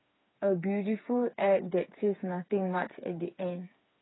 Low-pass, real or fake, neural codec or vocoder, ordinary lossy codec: 7.2 kHz; fake; codec, 16 kHz, 4 kbps, FreqCodec, smaller model; AAC, 16 kbps